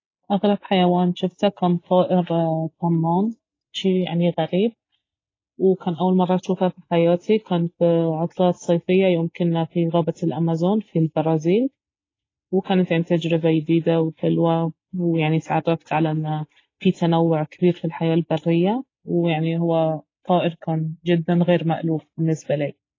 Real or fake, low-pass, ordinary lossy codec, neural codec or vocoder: real; 7.2 kHz; AAC, 32 kbps; none